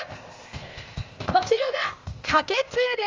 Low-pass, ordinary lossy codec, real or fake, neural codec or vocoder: 7.2 kHz; Opus, 32 kbps; fake; codec, 16 kHz, 0.8 kbps, ZipCodec